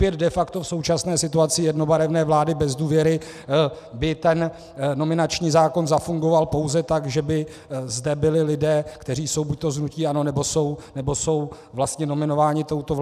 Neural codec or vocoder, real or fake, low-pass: none; real; 14.4 kHz